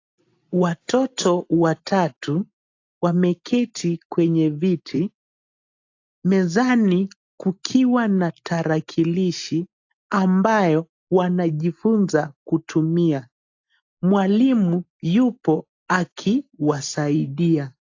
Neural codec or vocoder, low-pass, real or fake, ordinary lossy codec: none; 7.2 kHz; real; AAC, 48 kbps